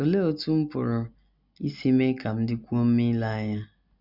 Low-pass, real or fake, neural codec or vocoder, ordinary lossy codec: 5.4 kHz; real; none; none